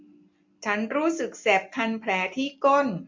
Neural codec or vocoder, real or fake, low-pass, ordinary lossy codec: none; real; 7.2 kHz; MP3, 48 kbps